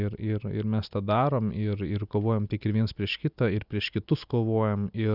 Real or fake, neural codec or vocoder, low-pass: real; none; 5.4 kHz